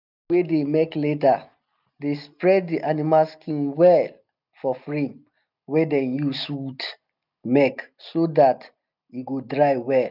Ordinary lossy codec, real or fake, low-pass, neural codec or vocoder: none; real; 5.4 kHz; none